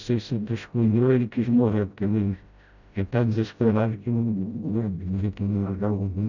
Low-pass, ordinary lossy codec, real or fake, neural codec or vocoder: 7.2 kHz; none; fake; codec, 16 kHz, 0.5 kbps, FreqCodec, smaller model